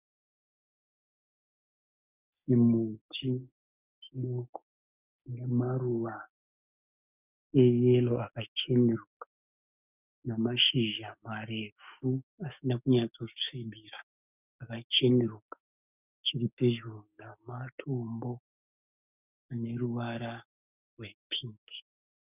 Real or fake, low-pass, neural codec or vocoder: fake; 3.6 kHz; codec, 16 kHz, 6 kbps, DAC